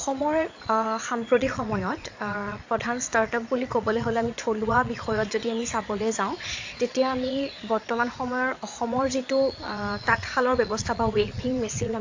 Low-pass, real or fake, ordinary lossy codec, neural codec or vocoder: 7.2 kHz; fake; AAC, 48 kbps; vocoder, 22.05 kHz, 80 mel bands, Vocos